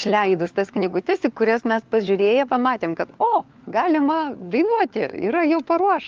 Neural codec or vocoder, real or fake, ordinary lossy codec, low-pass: codec, 16 kHz, 4 kbps, FunCodec, trained on LibriTTS, 50 frames a second; fake; Opus, 24 kbps; 7.2 kHz